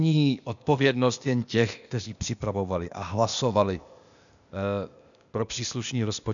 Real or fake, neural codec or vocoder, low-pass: fake; codec, 16 kHz, 0.8 kbps, ZipCodec; 7.2 kHz